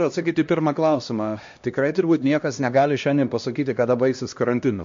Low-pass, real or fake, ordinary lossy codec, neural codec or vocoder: 7.2 kHz; fake; MP3, 48 kbps; codec, 16 kHz, 1 kbps, X-Codec, HuBERT features, trained on LibriSpeech